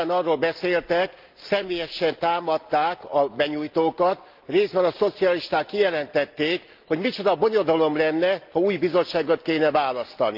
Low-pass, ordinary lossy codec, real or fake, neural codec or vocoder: 5.4 kHz; Opus, 24 kbps; real; none